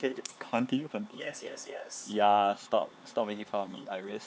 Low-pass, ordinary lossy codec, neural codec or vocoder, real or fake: none; none; codec, 16 kHz, 4 kbps, X-Codec, HuBERT features, trained on LibriSpeech; fake